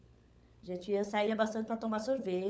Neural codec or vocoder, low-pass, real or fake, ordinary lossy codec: codec, 16 kHz, 16 kbps, FunCodec, trained on LibriTTS, 50 frames a second; none; fake; none